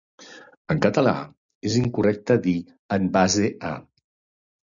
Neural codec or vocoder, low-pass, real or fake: none; 7.2 kHz; real